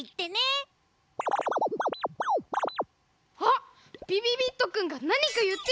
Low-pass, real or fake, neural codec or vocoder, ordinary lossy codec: none; real; none; none